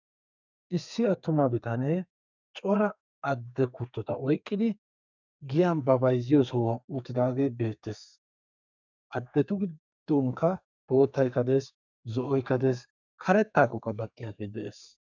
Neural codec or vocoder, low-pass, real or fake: codec, 32 kHz, 1.9 kbps, SNAC; 7.2 kHz; fake